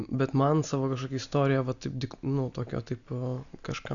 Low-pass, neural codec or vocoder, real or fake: 7.2 kHz; none; real